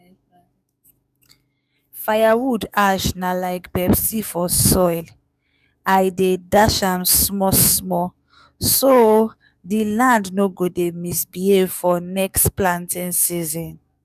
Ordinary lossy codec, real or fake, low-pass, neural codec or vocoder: none; fake; 14.4 kHz; codec, 44.1 kHz, 7.8 kbps, DAC